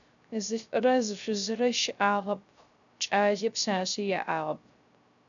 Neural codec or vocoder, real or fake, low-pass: codec, 16 kHz, 0.3 kbps, FocalCodec; fake; 7.2 kHz